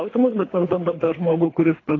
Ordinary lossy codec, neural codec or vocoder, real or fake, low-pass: AAC, 32 kbps; codec, 24 kHz, 3 kbps, HILCodec; fake; 7.2 kHz